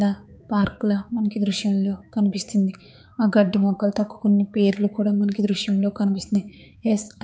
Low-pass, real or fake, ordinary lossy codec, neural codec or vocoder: none; fake; none; codec, 16 kHz, 4 kbps, X-Codec, HuBERT features, trained on balanced general audio